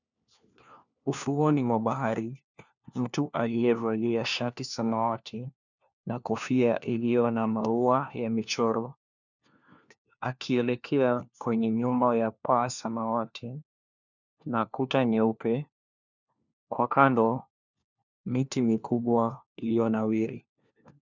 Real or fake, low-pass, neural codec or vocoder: fake; 7.2 kHz; codec, 16 kHz, 1 kbps, FunCodec, trained on LibriTTS, 50 frames a second